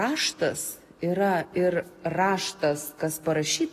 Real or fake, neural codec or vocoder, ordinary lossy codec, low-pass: real; none; AAC, 48 kbps; 14.4 kHz